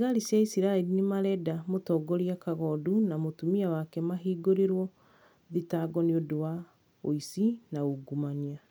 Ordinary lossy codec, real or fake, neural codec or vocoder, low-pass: none; real; none; none